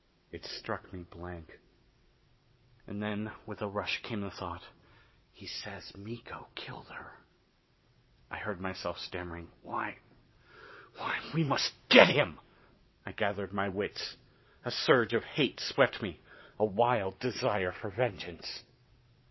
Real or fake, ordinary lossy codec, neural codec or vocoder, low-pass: fake; MP3, 24 kbps; vocoder, 22.05 kHz, 80 mel bands, WaveNeXt; 7.2 kHz